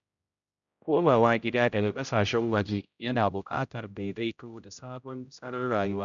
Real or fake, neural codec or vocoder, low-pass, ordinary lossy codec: fake; codec, 16 kHz, 0.5 kbps, X-Codec, HuBERT features, trained on general audio; 7.2 kHz; none